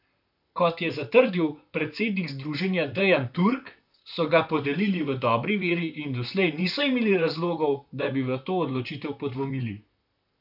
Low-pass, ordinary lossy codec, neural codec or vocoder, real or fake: 5.4 kHz; none; vocoder, 44.1 kHz, 128 mel bands, Pupu-Vocoder; fake